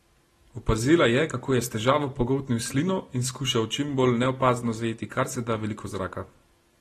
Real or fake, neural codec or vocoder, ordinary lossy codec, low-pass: real; none; AAC, 32 kbps; 19.8 kHz